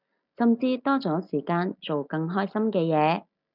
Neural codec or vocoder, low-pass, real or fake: none; 5.4 kHz; real